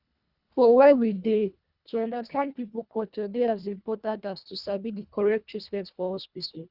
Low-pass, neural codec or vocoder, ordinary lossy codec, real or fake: 5.4 kHz; codec, 24 kHz, 1.5 kbps, HILCodec; Opus, 64 kbps; fake